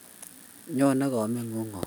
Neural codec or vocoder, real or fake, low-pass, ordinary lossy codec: none; real; none; none